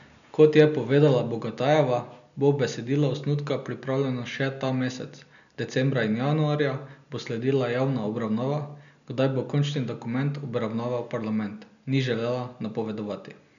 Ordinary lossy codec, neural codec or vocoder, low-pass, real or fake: none; none; 7.2 kHz; real